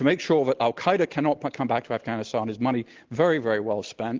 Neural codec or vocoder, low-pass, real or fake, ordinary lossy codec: none; 7.2 kHz; real; Opus, 16 kbps